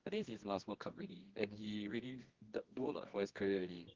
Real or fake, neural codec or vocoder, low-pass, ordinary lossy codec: fake; codec, 24 kHz, 0.9 kbps, WavTokenizer, medium music audio release; 7.2 kHz; Opus, 16 kbps